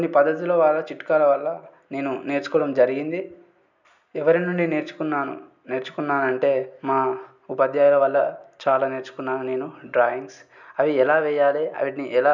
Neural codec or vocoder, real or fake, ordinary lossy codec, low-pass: none; real; none; 7.2 kHz